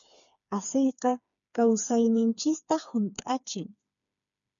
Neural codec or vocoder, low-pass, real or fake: codec, 16 kHz, 4 kbps, FreqCodec, smaller model; 7.2 kHz; fake